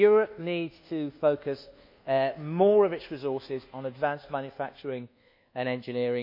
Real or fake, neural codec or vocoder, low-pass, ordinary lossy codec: fake; codec, 24 kHz, 1.2 kbps, DualCodec; 5.4 kHz; MP3, 48 kbps